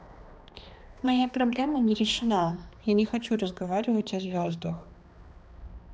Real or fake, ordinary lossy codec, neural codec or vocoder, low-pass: fake; none; codec, 16 kHz, 2 kbps, X-Codec, HuBERT features, trained on balanced general audio; none